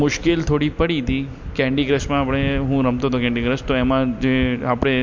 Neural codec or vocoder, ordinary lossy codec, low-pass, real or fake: none; MP3, 48 kbps; 7.2 kHz; real